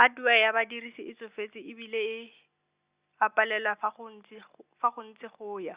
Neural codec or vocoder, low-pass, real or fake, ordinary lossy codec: none; 3.6 kHz; real; Opus, 64 kbps